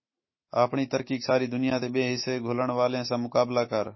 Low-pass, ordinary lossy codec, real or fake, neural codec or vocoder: 7.2 kHz; MP3, 24 kbps; fake; autoencoder, 48 kHz, 128 numbers a frame, DAC-VAE, trained on Japanese speech